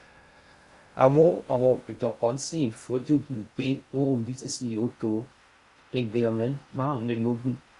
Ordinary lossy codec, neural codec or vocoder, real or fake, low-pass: Opus, 64 kbps; codec, 16 kHz in and 24 kHz out, 0.6 kbps, FocalCodec, streaming, 2048 codes; fake; 10.8 kHz